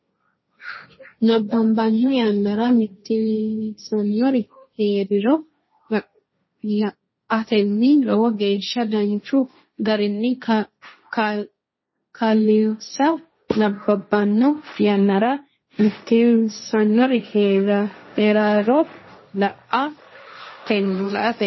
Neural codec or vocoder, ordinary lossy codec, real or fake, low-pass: codec, 16 kHz, 1.1 kbps, Voila-Tokenizer; MP3, 24 kbps; fake; 7.2 kHz